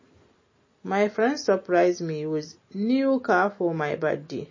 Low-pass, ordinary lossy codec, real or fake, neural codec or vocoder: 7.2 kHz; MP3, 32 kbps; real; none